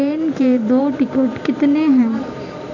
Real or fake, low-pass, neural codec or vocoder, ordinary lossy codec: fake; 7.2 kHz; vocoder, 44.1 kHz, 128 mel bands every 512 samples, BigVGAN v2; none